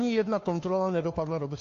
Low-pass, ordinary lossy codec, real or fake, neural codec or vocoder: 7.2 kHz; AAC, 48 kbps; fake; codec, 16 kHz, 2 kbps, FunCodec, trained on Chinese and English, 25 frames a second